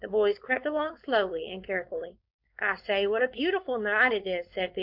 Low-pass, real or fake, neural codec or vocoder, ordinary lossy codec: 5.4 kHz; fake; codec, 16 kHz, 4.8 kbps, FACodec; MP3, 48 kbps